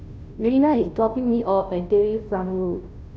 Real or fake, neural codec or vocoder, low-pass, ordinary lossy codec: fake; codec, 16 kHz, 0.5 kbps, FunCodec, trained on Chinese and English, 25 frames a second; none; none